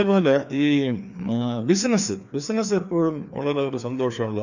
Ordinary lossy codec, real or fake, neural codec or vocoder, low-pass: none; fake; codec, 16 kHz, 2 kbps, FreqCodec, larger model; 7.2 kHz